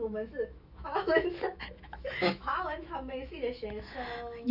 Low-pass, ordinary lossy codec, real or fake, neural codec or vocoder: 5.4 kHz; none; fake; autoencoder, 48 kHz, 128 numbers a frame, DAC-VAE, trained on Japanese speech